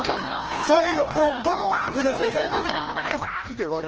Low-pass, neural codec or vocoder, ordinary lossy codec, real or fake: 7.2 kHz; codec, 16 kHz, 1 kbps, FreqCodec, larger model; Opus, 16 kbps; fake